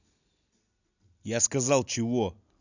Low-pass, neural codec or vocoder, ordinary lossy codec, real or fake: 7.2 kHz; none; none; real